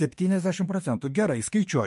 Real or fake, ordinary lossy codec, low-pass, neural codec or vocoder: fake; MP3, 48 kbps; 14.4 kHz; autoencoder, 48 kHz, 32 numbers a frame, DAC-VAE, trained on Japanese speech